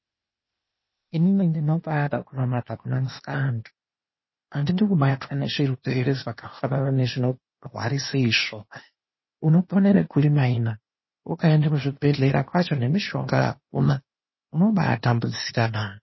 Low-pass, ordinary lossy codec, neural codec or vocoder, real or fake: 7.2 kHz; MP3, 24 kbps; codec, 16 kHz, 0.8 kbps, ZipCodec; fake